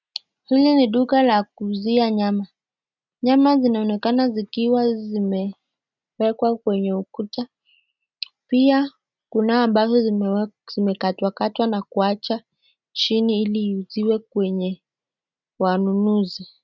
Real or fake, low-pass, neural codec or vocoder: real; 7.2 kHz; none